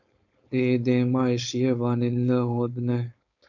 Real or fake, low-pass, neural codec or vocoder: fake; 7.2 kHz; codec, 16 kHz, 4.8 kbps, FACodec